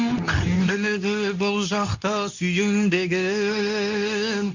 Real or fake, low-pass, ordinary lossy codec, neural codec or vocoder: fake; 7.2 kHz; none; codec, 24 kHz, 0.9 kbps, WavTokenizer, medium speech release version 2